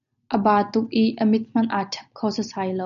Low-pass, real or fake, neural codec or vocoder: 7.2 kHz; real; none